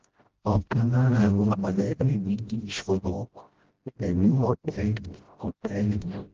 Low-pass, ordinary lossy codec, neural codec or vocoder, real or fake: 7.2 kHz; Opus, 16 kbps; codec, 16 kHz, 0.5 kbps, FreqCodec, smaller model; fake